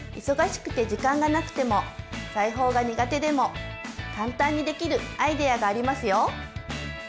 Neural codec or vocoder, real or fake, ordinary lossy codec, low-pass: none; real; none; none